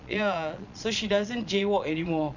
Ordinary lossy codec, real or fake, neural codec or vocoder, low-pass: none; fake; vocoder, 22.05 kHz, 80 mel bands, Vocos; 7.2 kHz